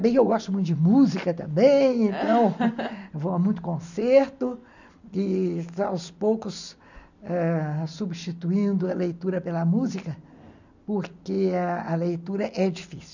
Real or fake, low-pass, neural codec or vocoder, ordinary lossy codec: real; 7.2 kHz; none; none